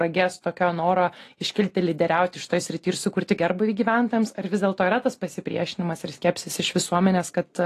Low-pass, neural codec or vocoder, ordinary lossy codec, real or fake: 14.4 kHz; none; AAC, 48 kbps; real